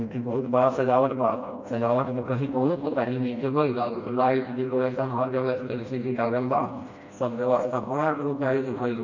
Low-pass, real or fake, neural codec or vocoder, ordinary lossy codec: 7.2 kHz; fake; codec, 16 kHz, 1 kbps, FreqCodec, smaller model; MP3, 48 kbps